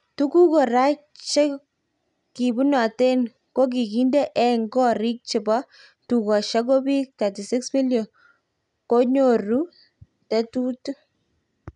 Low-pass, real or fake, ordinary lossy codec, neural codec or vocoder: 10.8 kHz; real; none; none